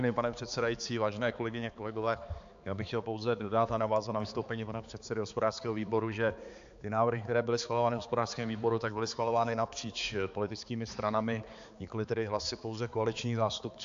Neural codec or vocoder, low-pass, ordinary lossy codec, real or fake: codec, 16 kHz, 4 kbps, X-Codec, HuBERT features, trained on balanced general audio; 7.2 kHz; AAC, 64 kbps; fake